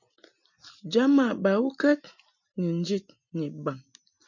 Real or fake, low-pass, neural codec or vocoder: real; 7.2 kHz; none